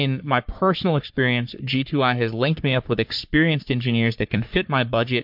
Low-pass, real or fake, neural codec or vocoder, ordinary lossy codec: 5.4 kHz; fake; codec, 44.1 kHz, 3.4 kbps, Pupu-Codec; MP3, 48 kbps